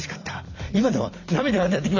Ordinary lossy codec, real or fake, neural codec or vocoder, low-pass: none; fake; vocoder, 44.1 kHz, 128 mel bands every 256 samples, BigVGAN v2; 7.2 kHz